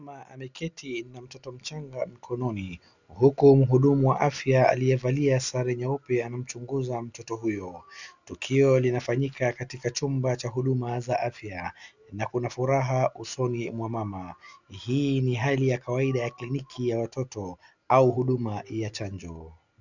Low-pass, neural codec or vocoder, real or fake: 7.2 kHz; none; real